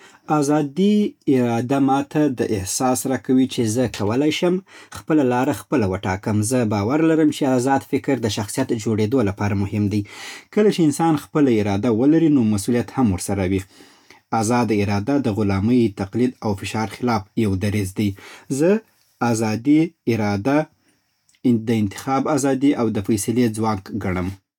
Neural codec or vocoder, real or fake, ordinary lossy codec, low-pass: none; real; none; 19.8 kHz